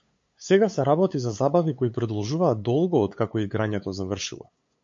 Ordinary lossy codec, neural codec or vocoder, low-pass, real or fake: MP3, 48 kbps; codec, 16 kHz, 8 kbps, FunCodec, trained on LibriTTS, 25 frames a second; 7.2 kHz; fake